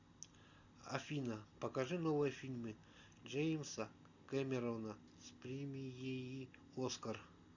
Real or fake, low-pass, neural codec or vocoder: real; 7.2 kHz; none